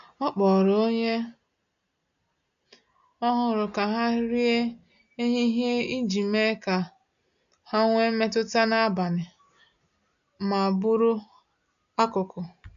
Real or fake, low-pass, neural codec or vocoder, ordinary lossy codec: real; 7.2 kHz; none; none